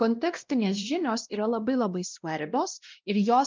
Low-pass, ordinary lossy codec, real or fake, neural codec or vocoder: 7.2 kHz; Opus, 32 kbps; fake; codec, 16 kHz, 1 kbps, X-Codec, WavLM features, trained on Multilingual LibriSpeech